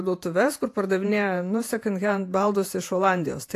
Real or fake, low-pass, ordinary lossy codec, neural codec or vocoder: fake; 14.4 kHz; AAC, 64 kbps; vocoder, 48 kHz, 128 mel bands, Vocos